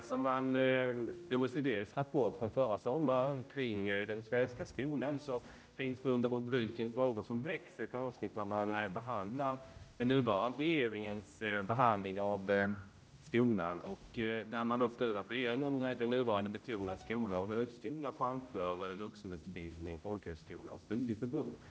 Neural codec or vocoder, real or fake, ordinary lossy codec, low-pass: codec, 16 kHz, 0.5 kbps, X-Codec, HuBERT features, trained on general audio; fake; none; none